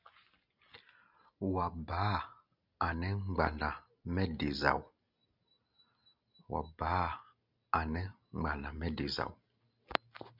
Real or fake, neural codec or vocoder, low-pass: real; none; 5.4 kHz